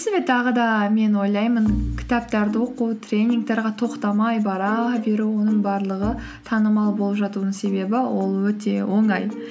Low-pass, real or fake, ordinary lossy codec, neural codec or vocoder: none; real; none; none